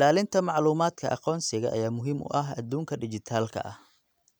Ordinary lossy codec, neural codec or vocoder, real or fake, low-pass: none; none; real; none